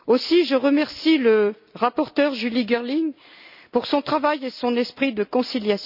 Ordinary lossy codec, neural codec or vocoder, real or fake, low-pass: none; none; real; 5.4 kHz